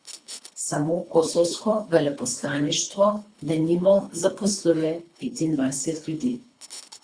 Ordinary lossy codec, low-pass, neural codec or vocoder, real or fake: Opus, 64 kbps; 9.9 kHz; codec, 24 kHz, 3 kbps, HILCodec; fake